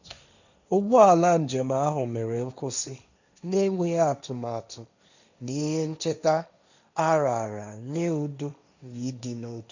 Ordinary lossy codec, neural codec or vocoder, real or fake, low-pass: none; codec, 16 kHz, 1.1 kbps, Voila-Tokenizer; fake; 7.2 kHz